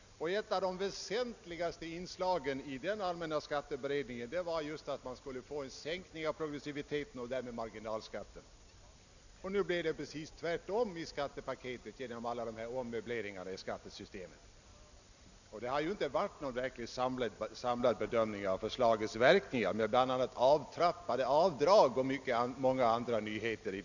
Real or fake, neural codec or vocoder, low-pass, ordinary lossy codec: real; none; 7.2 kHz; none